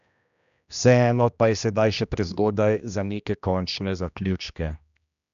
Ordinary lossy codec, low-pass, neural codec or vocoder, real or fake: none; 7.2 kHz; codec, 16 kHz, 1 kbps, X-Codec, HuBERT features, trained on general audio; fake